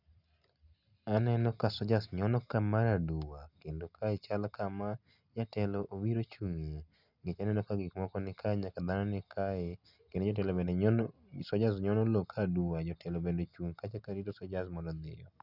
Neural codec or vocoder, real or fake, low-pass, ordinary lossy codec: none; real; 5.4 kHz; none